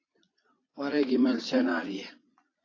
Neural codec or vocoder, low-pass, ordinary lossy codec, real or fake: vocoder, 22.05 kHz, 80 mel bands, Vocos; 7.2 kHz; AAC, 32 kbps; fake